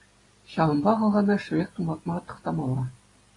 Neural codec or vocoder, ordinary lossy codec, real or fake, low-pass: none; AAC, 32 kbps; real; 10.8 kHz